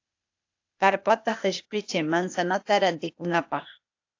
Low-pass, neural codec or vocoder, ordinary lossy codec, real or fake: 7.2 kHz; codec, 16 kHz, 0.8 kbps, ZipCodec; AAC, 48 kbps; fake